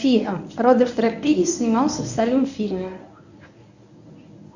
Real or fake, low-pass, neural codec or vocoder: fake; 7.2 kHz; codec, 24 kHz, 0.9 kbps, WavTokenizer, medium speech release version 1